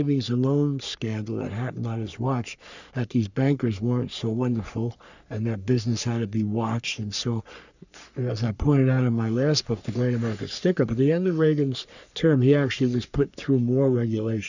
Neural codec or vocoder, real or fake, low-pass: codec, 44.1 kHz, 3.4 kbps, Pupu-Codec; fake; 7.2 kHz